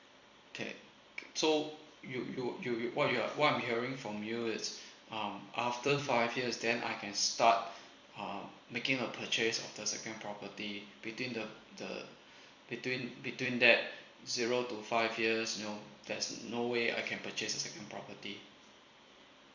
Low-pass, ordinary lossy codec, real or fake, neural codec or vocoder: 7.2 kHz; Opus, 64 kbps; fake; vocoder, 44.1 kHz, 128 mel bands every 256 samples, BigVGAN v2